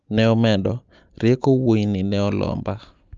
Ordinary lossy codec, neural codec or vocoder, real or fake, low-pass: Opus, 24 kbps; none; real; 7.2 kHz